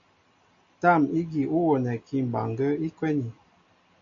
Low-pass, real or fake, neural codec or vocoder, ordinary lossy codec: 7.2 kHz; real; none; MP3, 64 kbps